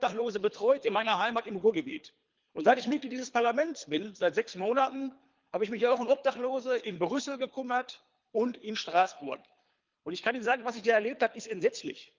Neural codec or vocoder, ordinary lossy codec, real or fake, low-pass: codec, 24 kHz, 3 kbps, HILCodec; Opus, 24 kbps; fake; 7.2 kHz